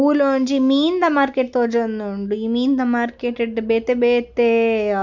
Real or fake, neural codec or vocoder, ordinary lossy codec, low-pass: real; none; none; 7.2 kHz